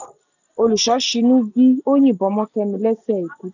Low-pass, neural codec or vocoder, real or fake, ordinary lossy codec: 7.2 kHz; none; real; none